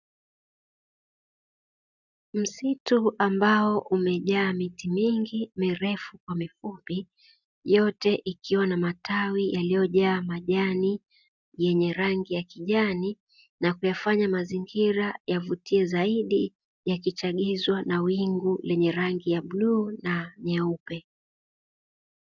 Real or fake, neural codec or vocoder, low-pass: real; none; 7.2 kHz